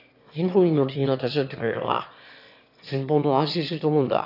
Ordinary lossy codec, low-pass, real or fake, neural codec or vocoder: none; 5.4 kHz; fake; autoencoder, 22.05 kHz, a latent of 192 numbers a frame, VITS, trained on one speaker